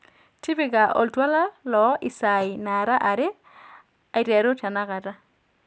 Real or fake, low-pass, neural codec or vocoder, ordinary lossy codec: real; none; none; none